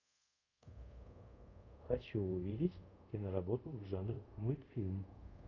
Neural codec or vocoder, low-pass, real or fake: codec, 24 kHz, 0.5 kbps, DualCodec; 7.2 kHz; fake